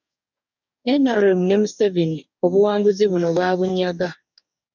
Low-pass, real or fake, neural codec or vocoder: 7.2 kHz; fake; codec, 44.1 kHz, 2.6 kbps, DAC